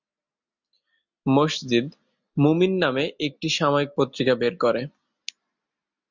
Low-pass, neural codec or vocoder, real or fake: 7.2 kHz; none; real